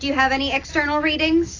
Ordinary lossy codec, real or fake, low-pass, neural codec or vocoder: AAC, 32 kbps; real; 7.2 kHz; none